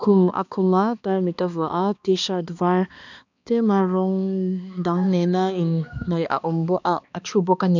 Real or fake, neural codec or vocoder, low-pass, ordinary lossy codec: fake; codec, 16 kHz, 2 kbps, X-Codec, HuBERT features, trained on balanced general audio; 7.2 kHz; none